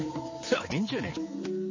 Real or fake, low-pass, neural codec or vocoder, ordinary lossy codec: fake; 7.2 kHz; codec, 16 kHz, 4 kbps, X-Codec, HuBERT features, trained on general audio; MP3, 32 kbps